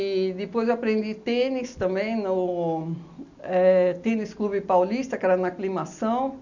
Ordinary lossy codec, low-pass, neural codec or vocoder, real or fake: none; 7.2 kHz; none; real